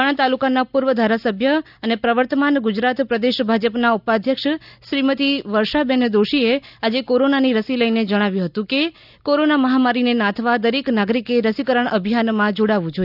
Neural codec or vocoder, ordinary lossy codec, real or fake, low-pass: none; none; real; 5.4 kHz